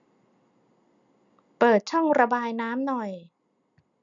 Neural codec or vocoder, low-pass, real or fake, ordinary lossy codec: none; 7.2 kHz; real; none